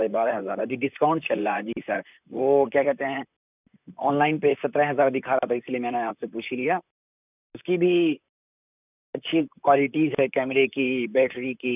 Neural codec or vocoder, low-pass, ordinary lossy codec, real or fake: vocoder, 44.1 kHz, 128 mel bands, Pupu-Vocoder; 3.6 kHz; none; fake